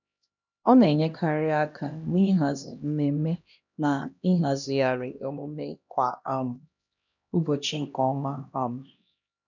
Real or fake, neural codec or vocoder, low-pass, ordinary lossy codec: fake; codec, 16 kHz, 1 kbps, X-Codec, HuBERT features, trained on LibriSpeech; 7.2 kHz; none